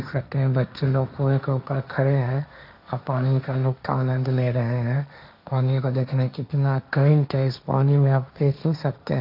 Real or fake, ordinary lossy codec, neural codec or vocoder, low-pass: fake; none; codec, 16 kHz, 1.1 kbps, Voila-Tokenizer; 5.4 kHz